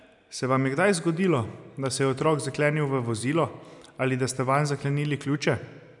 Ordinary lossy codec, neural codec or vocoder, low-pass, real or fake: none; none; 10.8 kHz; real